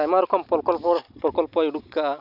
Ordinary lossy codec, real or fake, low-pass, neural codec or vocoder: none; real; 5.4 kHz; none